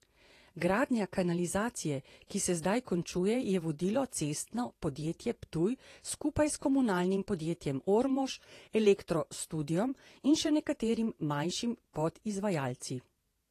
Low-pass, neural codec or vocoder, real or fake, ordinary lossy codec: 14.4 kHz; vocoder, 48 kHz, 128 mel bands, Vocos; fake; AAC, 48 kbps